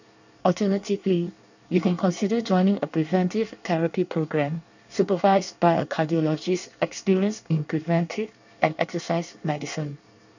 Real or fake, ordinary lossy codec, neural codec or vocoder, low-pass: fake; none; codec, 24 kHz, 1 kbps, SNAC; 7.2 kHz